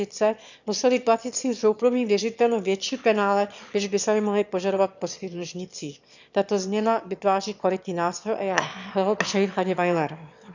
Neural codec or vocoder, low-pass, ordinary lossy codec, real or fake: autoencoder, 22.05 kHz, a latent of 192 numbers a frame, VITS, trained on one speaker; 7.2 kHz; none; fake